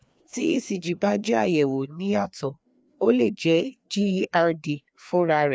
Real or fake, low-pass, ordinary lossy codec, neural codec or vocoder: fake; none; none; codec, 16 kHz, 2 kbps, FreqCodec, larger model